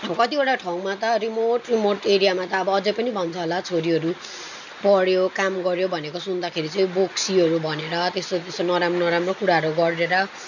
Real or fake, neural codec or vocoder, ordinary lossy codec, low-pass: real; none; none; 7.2 kHz